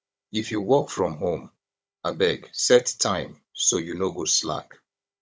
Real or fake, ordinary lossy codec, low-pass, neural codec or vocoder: fake; none; none; codec, 16 kHz, 16 kbps, FunCodec, trained on Chinese and English, 50 frames a second